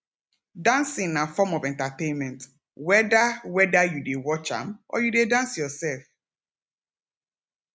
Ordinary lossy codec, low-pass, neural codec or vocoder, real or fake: none; none; none; real